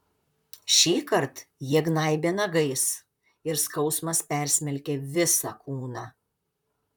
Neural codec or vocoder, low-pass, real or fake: vocoder, 44.1 kHz, 128 mel bands, Pupu-Vocoder; 19.8 kHz; fake